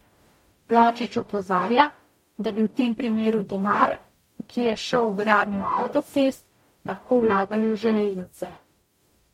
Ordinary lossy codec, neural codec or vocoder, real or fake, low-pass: MP3, 64 kbps; codec, 44.1 kHz, 0.9 kbps, DAC; fake; 19.8 kHz